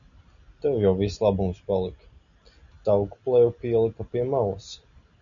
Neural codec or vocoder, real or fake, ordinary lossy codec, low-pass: none; real; MP3, 96 kbps; 7.2 kHz